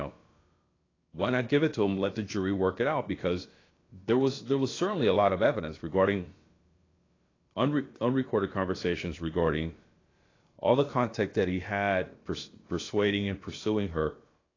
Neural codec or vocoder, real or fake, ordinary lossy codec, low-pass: codec, 16 kHz, about 1 kbps, DyCAST, with the encoder's durations; fake; AAC, 32 kbps; 7.2 kHz